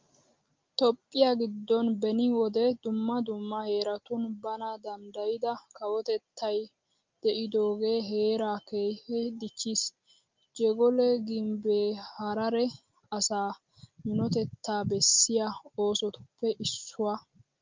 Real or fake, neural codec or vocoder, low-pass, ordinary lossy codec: real; none; 7.2 kHz; Opus, 24 kbps